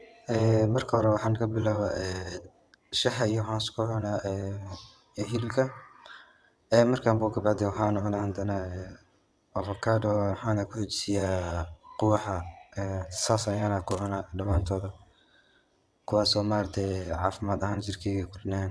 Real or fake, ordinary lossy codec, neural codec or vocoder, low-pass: fake; none; vocoder, 22.05 kHz, 80 mel bands, WaveNeXt; none